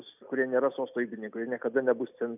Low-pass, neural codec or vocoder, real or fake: 3.6 kHz; none; real